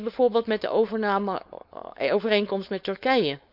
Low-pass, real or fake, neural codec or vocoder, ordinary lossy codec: 5.4 kHz; fake; codec, 16 kHz, 4.8 kbps, FACodec; none